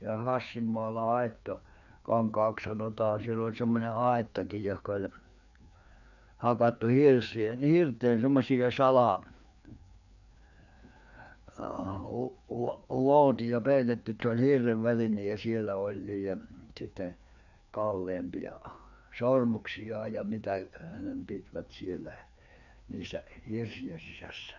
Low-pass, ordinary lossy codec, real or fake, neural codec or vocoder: 7.2 kHz; none; fake; codec, 16 kHz, 2 kbps, FreqCodec, larger model